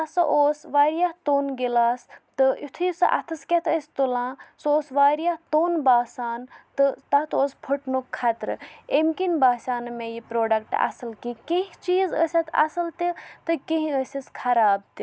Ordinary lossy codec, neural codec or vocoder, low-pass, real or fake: none; none; none; real